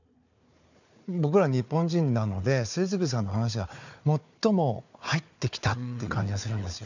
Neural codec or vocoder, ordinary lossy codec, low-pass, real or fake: codec, 16 kHz, 4 kbps, FunCodec, trained on Chinese and English, 50 frames a second; none; 7.2 kHz; fake